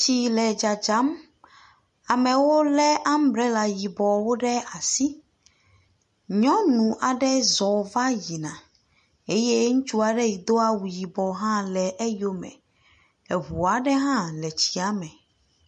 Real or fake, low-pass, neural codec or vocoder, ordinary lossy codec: real; 14.4 kHz; none; MP3, 48 kbps